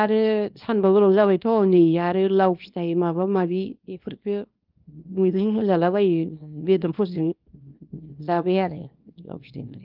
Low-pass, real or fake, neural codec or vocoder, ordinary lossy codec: 5.4 kHz; fake; codec, 24 kHz, 0.9 kbps, WavTokenizer, small release; Opus, 32 kbps